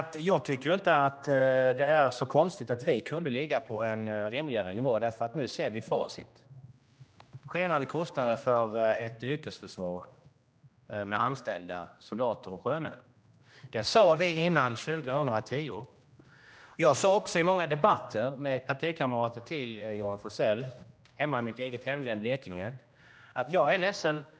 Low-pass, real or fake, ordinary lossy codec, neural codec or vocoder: none; fake; none; codec, 16 kHz, 1 kbps, X-Codec, HuBERT features, trained on general audio